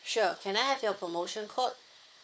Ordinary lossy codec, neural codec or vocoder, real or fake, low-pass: none; codec, 16 kHz, 4 kbps, FunCodec, trained on Chinese and English, 50 frames a second; fake; none